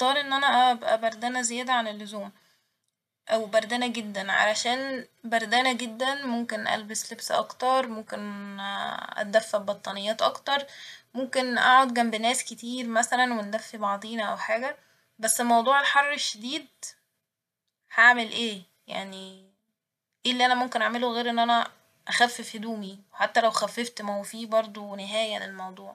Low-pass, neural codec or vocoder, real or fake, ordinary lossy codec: 14.4 kHz; none; real; MP3, 96 kbps